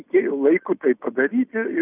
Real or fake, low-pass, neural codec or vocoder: real; 3.6 kHz; none